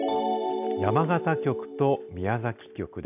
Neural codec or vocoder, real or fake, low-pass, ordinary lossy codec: none; real; 3.6 kHz; none